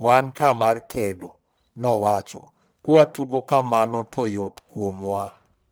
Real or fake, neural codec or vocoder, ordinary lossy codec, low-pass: fake; codec, 44.1 kHz, 1.7 kbps, Pupu-Codec; none; none